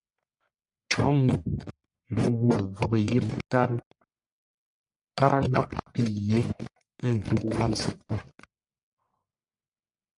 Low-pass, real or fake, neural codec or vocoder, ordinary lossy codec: 10.8 kHz; fake; codec, 44.1 kHz, 1.7 kbps, Pupu-Codec; MP3, 64 kbps